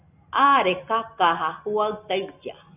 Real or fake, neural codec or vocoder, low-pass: real; none; 3.6 kHz